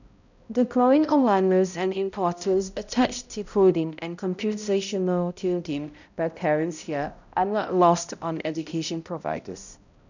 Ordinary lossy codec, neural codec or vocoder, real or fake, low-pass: none; codec, 16 kHz, 0.5 kbps, X-Codec, HuBERT features, trained on balanced general audio; fake; 7.2 kHz